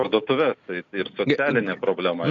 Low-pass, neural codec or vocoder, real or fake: 7.2 kHz; none; real